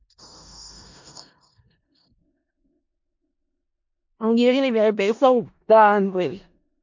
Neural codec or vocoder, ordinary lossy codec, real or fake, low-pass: codec, 16 kHz in and 24 kHz out, 0.4 kbps, LongCat-Audio-Codec, four codebook decoder; MP3, 48 kbps; fake; 7.2 kHz